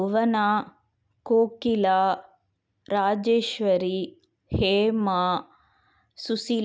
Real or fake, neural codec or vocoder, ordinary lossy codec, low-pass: real; none; none; none